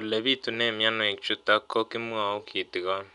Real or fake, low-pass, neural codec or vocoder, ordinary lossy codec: real; 10.8 kHz; none; none